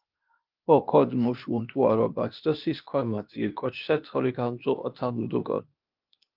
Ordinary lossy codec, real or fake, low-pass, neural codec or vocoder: Opus, 24 kbps; fake; 5.4 kHz; codec, 16 kHz, 0.8 kbps, ZipCodec